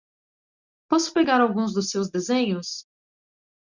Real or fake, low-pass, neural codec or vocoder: real; 7.2 kHz; none